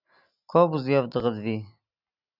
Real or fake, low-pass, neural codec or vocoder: real; 5.4 kHz; none